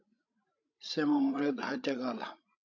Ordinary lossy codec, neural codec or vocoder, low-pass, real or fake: AAC, 48 kbps; codec, 16 kHz, 16 kbps, FreqCodec, larger model; 7.2 kHz; fake